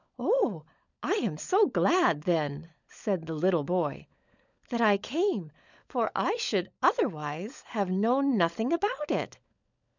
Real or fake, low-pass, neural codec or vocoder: fake; 7.2 kHz; codec, 16 kHz, 16 kbps, FunCodec, trained on LibriTTS, 50 frames a second